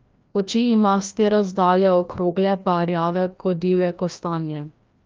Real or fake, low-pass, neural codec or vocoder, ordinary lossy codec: fake; 7.2 kHz; codec, 16 kHz, 1 kbps, FreqCodec, larger model; Opus, 24 kbps